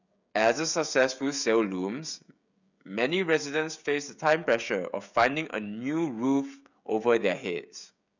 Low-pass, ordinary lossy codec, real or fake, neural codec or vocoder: 7.2 kHz; none; fake; codec, 16 kHz, 16 kbps, FreqCodec, smaller model